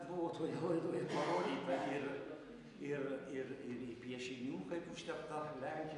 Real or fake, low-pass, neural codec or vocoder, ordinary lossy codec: real; 10.8 kHz; none; AAC, 64 kbps